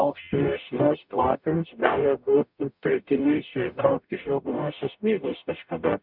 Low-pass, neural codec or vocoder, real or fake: 5.4 kHz; codec, 44.1 kHz, 0.9 kbps, DAC; fake